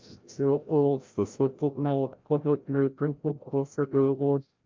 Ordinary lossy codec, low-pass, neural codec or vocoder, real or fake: Opus, 24 kbps; 7.2 kHz; codec, 16 kHz, 0.5 kbps, FreqCodec, larger model; fake